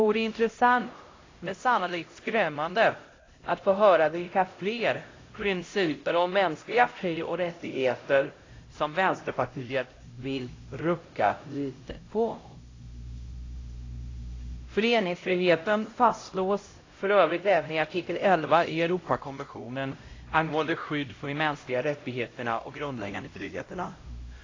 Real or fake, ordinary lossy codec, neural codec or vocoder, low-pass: fake; AAC, 32 kbps; codec, 16 kHz, 0.5 kbps, X-Codec, HuBERT features, trained on LibriSpeech; 7.2 kHz